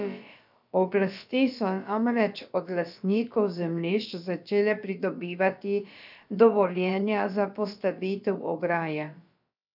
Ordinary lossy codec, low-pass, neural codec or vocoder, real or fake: none; 5.4 kHz; codec, 16 kHz, about 1 kbps, DyCAST, with the encoder's durations; fake